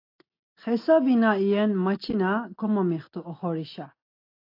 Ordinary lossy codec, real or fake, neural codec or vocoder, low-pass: AAC, 32 kbps; real; none; 5.4 kHz